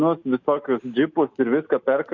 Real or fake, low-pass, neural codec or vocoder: real; 7.2 kHz; none